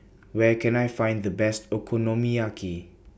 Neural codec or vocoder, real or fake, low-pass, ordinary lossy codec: none; real; none; none